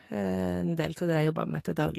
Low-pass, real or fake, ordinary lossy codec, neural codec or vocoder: 14.4 kHz; fake; AAC, 64 kbps; codec, 44.1 kHz, 2.6 kbps, SNAC